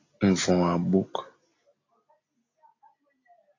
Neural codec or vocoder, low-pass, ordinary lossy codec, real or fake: none; 7.2 kHz; AAC, 32 kbps; real